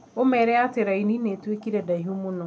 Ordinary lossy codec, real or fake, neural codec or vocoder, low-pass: none; real; none; none